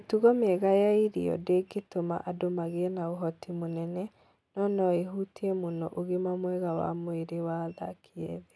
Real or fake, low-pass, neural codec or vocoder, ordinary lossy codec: real; none; none; none